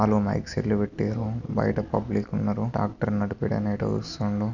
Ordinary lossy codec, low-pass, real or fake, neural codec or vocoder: none; 7.2 kHz; real; none